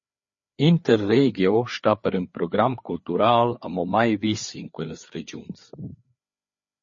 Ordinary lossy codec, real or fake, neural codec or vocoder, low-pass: MP3, 32 kbps; fake; codec, 16 kHz, 4 kbps, FreqCodec, larger model; 7.2 kHz